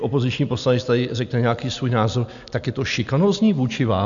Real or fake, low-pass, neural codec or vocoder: real; 7.2 kHz; none